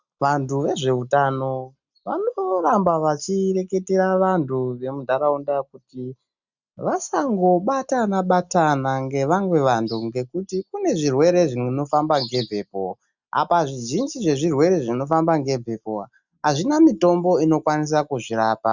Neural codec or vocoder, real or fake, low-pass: none; real; 7.2 kHz